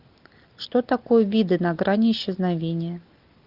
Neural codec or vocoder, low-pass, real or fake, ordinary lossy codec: none; 5.4 kHz; real; Opus, 24 kbps